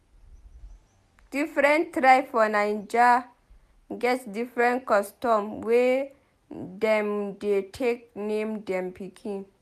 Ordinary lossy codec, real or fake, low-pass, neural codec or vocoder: none; real; 14.4 kHz; none